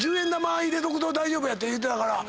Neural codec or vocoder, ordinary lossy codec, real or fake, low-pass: none; none; real; none